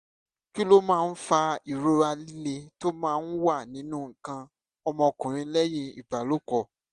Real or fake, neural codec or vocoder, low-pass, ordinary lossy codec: real; none; 10.8 kHz; Opus, 64 kbps